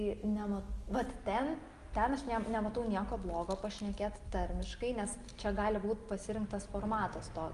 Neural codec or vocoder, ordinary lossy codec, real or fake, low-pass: none; Opus, 32 kbps; real; 10.8 kHz